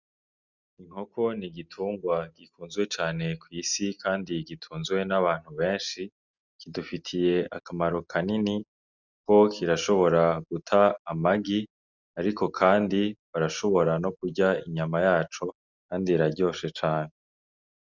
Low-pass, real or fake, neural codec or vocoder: 7.2 kHz; real; none